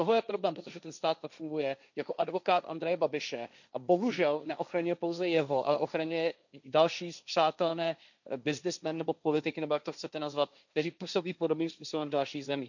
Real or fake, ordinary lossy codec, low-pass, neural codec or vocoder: fake; none; 7.2 kHz; codec, 16 kHz, 1.1 kbps, Voila-Tokenizer